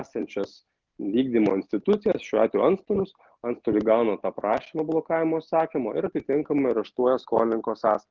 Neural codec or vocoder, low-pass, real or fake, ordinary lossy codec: none; 7.2 kHz; real; Opus, 32 kbps